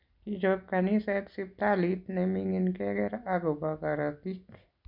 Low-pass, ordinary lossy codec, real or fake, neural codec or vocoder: 5.4 kHz; none; real; none